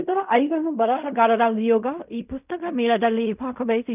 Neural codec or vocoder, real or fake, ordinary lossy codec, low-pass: codec, 16 kHz in and 24 kHz out, 0.4 kbps, LongCat-Audio-Codec, fine tuned four codebook decoder; fake; none; 3.6 kHz